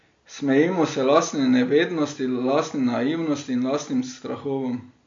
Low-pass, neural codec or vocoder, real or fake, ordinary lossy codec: 7.2 kHz; none; real; MP3, 48 kbps